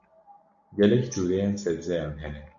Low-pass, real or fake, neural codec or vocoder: 7.2 kHz; real; none